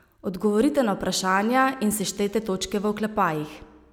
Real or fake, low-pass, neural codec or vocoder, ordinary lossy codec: real; 19.8 kHz; none; none